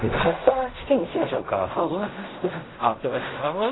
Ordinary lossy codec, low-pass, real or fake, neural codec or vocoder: AAC, 16 kbps; 7.2 kHz; fake; codec, 16 kHz in and 24 kHz out, 0.4 kbps, LongCat-Audio-Codec, fine tuned four codebook decoder